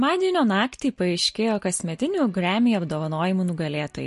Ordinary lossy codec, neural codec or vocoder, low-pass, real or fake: MP3, 48 kbps; none; 14.4 kHz; real